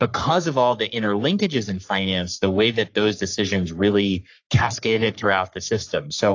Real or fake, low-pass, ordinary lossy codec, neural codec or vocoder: fake; 7.2 kHz; AAC, 48 kbps; codec, 44.1 kHz, 3.4 kbps, Pupu-Codec